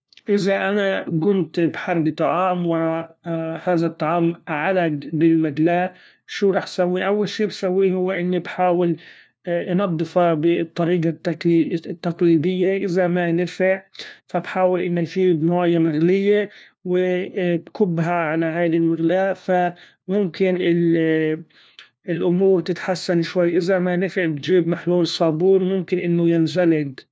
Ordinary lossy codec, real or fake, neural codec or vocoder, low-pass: none; fake; codec, 16 kHz, 1 kbps, FunCodec, trained on LibriTTS, 50 frames a second; none